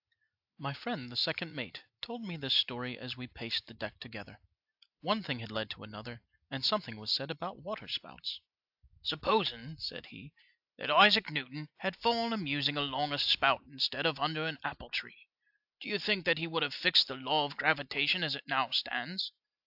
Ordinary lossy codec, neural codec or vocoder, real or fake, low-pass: AAC, 48 kbps; none; real; 5.4 kHz